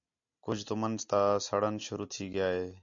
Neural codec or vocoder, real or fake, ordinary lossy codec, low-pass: none; real; MP3, 48 kbps; 7.2 kHz